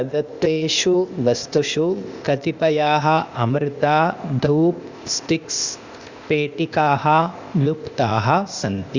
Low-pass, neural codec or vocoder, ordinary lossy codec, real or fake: 7.2 kHz; codec, 16 kHz, 0.8 kbps, ZipCodec; Opus, 64 kbps; fake